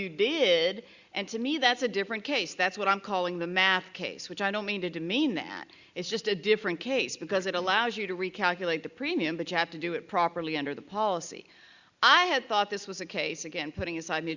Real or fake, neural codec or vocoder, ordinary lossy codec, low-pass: real; none; Opus, 64 kbps; 7.2 kHz